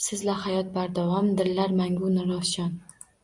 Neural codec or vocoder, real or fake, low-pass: none; real; 10.8 kHz